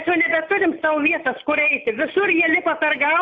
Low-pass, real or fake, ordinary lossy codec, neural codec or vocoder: 7.2 kHz; real; AAC, 48 kbps; none